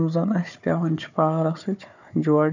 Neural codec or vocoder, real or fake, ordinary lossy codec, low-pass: codec, 16 kHz, 4 kbps, FunCodec, trained on Chinese and English, 50 frames a second; fake; none; 7.2 kHz